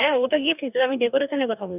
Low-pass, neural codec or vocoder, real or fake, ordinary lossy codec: 3.6 kHz; codec, 44.1 kHz, 2.6 kbps, DAC; fake; none